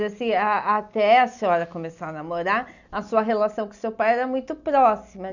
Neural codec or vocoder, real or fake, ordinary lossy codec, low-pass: none; real; none; 7.2 kHz